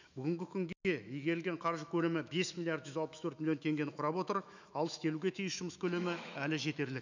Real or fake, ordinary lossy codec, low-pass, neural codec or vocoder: fake; none; 7.2 kHz; autoencoder, 48 kHz, 128 numbers a frame, DAC-VAE, trained on Japanese speech